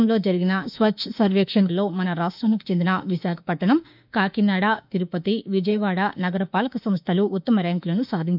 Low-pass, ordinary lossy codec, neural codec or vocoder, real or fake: 5.4 kHz; none; autoencoder, 48 kHz, 32 numbers a frame, DAC-VAE, trained on Japanese speech; fake